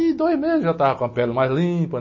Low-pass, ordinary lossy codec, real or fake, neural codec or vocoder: 7.2 kHz; MP3, 32 kbps; real; none